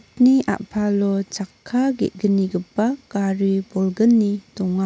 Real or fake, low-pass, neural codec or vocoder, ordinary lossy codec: real; none; none; none